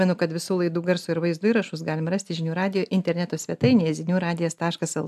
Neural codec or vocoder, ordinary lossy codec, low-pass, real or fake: none; AAC, 96 kbps; 14.4 kHz; real